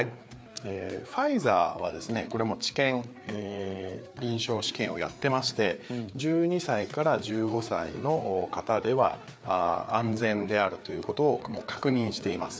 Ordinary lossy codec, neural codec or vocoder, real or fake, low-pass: none; codec, 16 kHz, 4 kbps, FreqCodec, larger model; fake; none